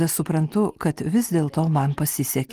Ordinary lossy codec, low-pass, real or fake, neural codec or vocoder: Opus, 24 kbps; 14.4 kHz; real; none